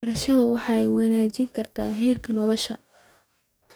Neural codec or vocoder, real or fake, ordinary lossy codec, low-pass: codec, 44.1 kHz, 2.6 kbps, DAC; fake; none; none